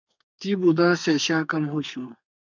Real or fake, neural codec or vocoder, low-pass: fake; codec, 32 kHz, 1.9 kbps, SNAC; 7.2 kHz